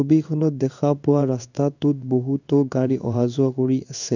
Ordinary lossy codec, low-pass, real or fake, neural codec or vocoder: none; 7.2 kHz; fake; codec, 16 kHz in and 24 kHz out, 1 kbps, XY-Tokenizer